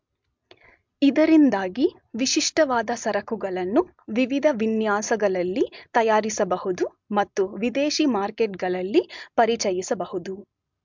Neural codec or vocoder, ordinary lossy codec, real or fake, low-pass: none; MP3, 64 kbps; real; 7.2 kHz